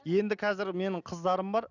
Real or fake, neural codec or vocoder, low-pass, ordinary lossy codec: real; none; 7.2 kHz; none